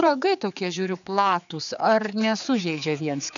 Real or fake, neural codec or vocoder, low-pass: fake; codec, 16 kHz, 4 kbps, X-Codec, HuBERT features, trained on general audio; 7.2 kHz